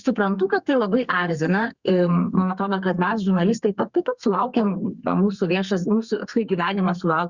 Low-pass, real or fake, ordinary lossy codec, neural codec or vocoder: 7.2 kHz; fake; Opus, 64 kbps; codec, 32 kHz, 1.9 kbps, SNAC